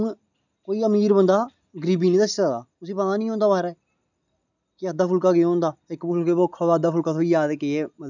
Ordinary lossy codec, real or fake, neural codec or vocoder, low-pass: none; real; none; 7.2 kHz